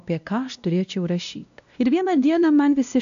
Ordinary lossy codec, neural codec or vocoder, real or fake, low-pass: AAC, 96 kbps; codec, 16 kHz, 1 kbps, X-Codec, WavLM features, trained on Multilingual LibriSpeech; fake; 7.2 kHz